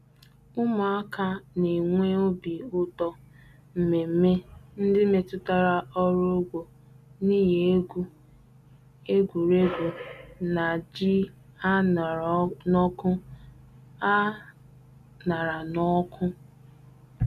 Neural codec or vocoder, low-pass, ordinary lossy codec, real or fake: none; 14.4 kHz; none; real